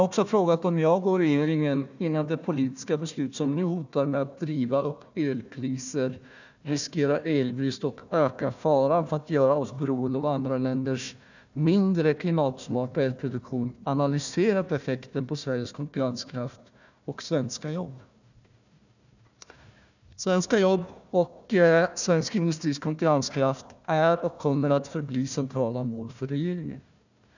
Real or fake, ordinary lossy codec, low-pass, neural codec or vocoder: fake; none; 7.2 kHz; codec, 16 kHz, 1 kbps, FunCodec, trained on Chinese and English, 50 frames a second